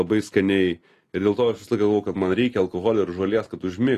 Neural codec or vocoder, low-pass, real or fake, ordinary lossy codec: none; 14.4 kHz; real; AAC, 48 kbps